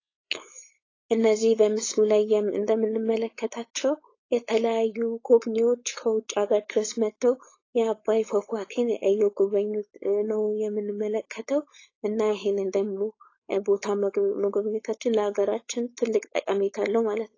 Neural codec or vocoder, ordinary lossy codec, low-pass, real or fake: codec, 16 kHz, 4.8 kbps, FACodec; AAC, 32 kbps; 7.2 kHz; fake